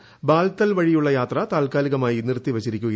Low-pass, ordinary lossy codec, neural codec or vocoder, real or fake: none; none; none; real